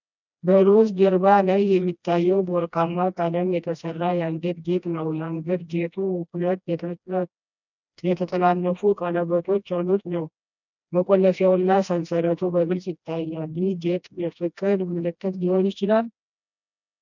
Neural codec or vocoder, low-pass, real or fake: codec, 16 kHz, 1 kbps, FreqCodec, smaller model; 7.2 kHz; fake